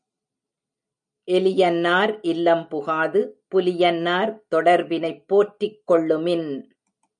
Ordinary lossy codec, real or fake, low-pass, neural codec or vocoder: MP3, 96 kbps; real; 9.9 kHz; none